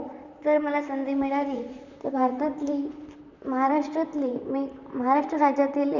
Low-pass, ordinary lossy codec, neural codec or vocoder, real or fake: 7.2 kHz; none; vocoder, 44.1 kHz, 128 mel bands, Pupu-Vocoder; fake